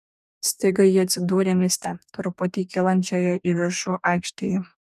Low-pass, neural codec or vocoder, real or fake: 14.4 kHz; codec, 44.1 kHz, 2.6 kbps, DAC; fake